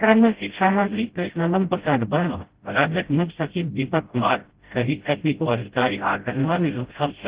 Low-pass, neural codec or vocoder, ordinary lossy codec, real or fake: 3.6 kHz; codec, 16 kHz, 0.5 kbps, FreqCodec, smaller model; Opus, 16 kbps; fake